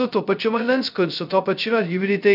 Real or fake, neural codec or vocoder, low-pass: fake; codec, 16 kHz, 0.2 kbps, FocalCodec; 5.4 kHz